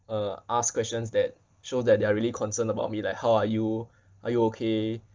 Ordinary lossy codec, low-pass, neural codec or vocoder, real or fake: Opus, 24 kbps; 7.2 kHz; none; real